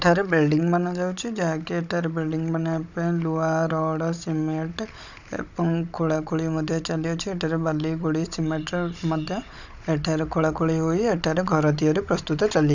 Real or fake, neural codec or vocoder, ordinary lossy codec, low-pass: fake; codec, 16 kHz, 16 kbps, FunCodec, trained on Chinese and English, 50 frames a second; none; 7.2 kHz